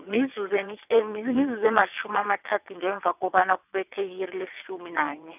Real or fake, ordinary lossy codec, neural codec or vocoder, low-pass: fake; none; vocoder, 22.05 kHz, 80 mel bands, WaveNeXt; 3.6 kHz